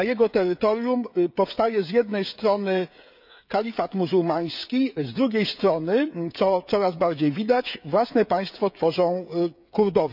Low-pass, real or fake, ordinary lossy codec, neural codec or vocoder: 5.4 kHz; fake; none; codec, 16 kHz, 16 kbps, FreqCodec, smaller model